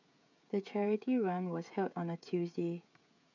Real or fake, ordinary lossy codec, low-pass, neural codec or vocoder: fake; none; 7.2 kHz; codec, 16 kHz, 16 kbps, FreqCodec, smaller model